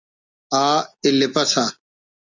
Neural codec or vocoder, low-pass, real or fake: none; 7.2 kHz; real